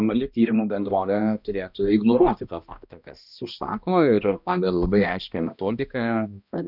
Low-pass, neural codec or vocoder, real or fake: 5.4 kHz; codec, 16 kHz, 1 kbps, X-Codec, HuBERT features, trained on balanced general audio; fake